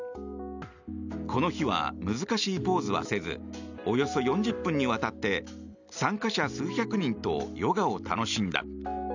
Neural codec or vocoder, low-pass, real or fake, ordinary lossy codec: none; 7.2 kHz; real; none